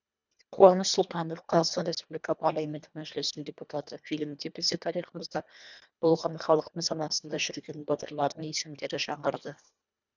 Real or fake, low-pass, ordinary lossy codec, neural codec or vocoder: fake; 7.2 kHz; none; codec, 24 kHz, 1.5 kbps, HILCodec